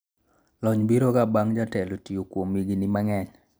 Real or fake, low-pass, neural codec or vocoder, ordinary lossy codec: real; none; none; none